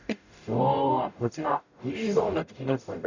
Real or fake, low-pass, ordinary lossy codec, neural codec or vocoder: fake; 7.2 kHz; none; codec, 44.1 kHz, 0.9 kbps, DAC